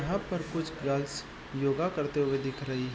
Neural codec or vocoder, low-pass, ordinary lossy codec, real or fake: none; none; none; real